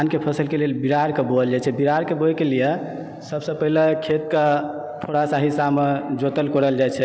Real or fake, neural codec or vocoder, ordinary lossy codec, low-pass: real; none; none; none